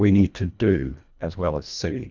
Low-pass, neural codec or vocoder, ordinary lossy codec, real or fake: 7.2 kHz; codec, 24 kHz, 1.5 kbps, HILCodec; Opus, 64 kbps; fake